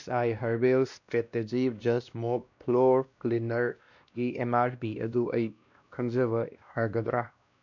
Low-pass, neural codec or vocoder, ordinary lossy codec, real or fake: 7.2 kHz; codec, 16 kHz, 1 kbps, X-Codec, WavLM features, trained on Multilingual LibriSpeech; none; fake